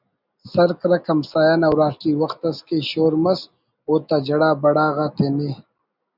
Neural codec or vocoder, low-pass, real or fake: none; 5.4 kHz; real